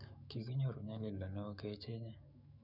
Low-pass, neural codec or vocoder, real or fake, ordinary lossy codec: 5.4 kHz; autoencoder, 48 kHz, 128 numbers a frame, DAC-VAE, trained on Japanese speech; fake; none